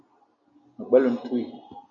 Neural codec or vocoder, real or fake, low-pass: none; real; 7.2 kHz